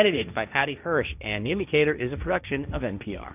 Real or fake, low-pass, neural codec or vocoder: fake; 3.6 kHz; codec, 16 kHz, 1.1 kbps, Voila-Tokenizer